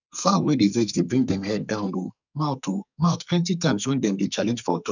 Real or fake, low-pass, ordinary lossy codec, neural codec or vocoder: fake; 7.2 kHz; none; codec, 44.1 kHz, 2.6 kbps, SNAC